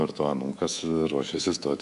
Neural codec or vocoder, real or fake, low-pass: codec, 24 kHz, 3.1 kbps, DualCodec; fake; 10.8 kHz